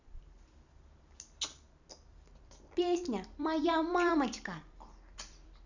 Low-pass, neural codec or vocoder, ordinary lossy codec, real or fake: 7.2 kHz; vocoder, 44.1 kHz, 128 mel bands every 256 samples, BigVGAN v2; none; fake